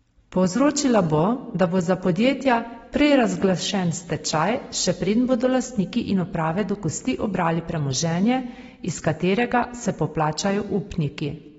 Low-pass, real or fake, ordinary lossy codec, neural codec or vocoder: 19.8 kHz; real; AAC, 24 kbps; none